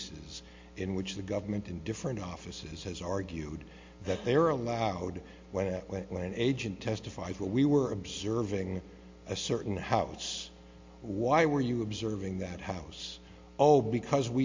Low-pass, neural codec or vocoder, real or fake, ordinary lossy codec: 7.2 kHz; none; real; MP3, 48 kbps